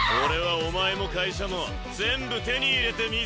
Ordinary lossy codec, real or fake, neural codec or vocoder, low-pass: none; real; none; none